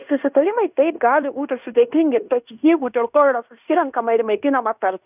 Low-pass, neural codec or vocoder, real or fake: 3.6 kHz; codec, 16 kHz in and 24 kHz out, 0.9 kbps, LongCat-Audio-Codec, fine tuned four codebook decoder; fake